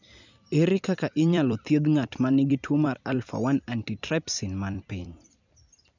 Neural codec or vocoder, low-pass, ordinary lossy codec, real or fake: vocoder, 44.1 kHz, 128 mel bands every 256 samples, BigVGAN v2; 7.2 kHz; none; fake